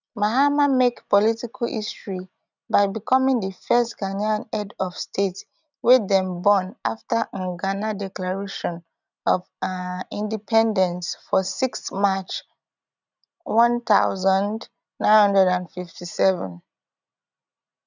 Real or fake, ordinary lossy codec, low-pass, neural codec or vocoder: real; none; 7.2 kHz; none